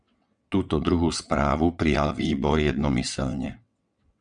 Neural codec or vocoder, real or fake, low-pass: vocoder, 22.05 kHz, 80 mel bands, WaveNeXt; fake; 9.9 kHz